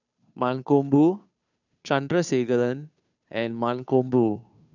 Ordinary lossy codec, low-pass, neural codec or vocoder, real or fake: none; 7.2 kHz; codec, 16 kHz, 2 kbps, FunCodec, trained on Chinese and English, 25 frames a second; fake